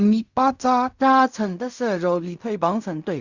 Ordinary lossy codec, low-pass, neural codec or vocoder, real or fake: Opus, 64 kbps; 7.2 kHz; codec, 16 kHz in and 24 kHz out, 0.4 kbps, LongCat-Audio-Codec, fine tuned four codebook decoder; fake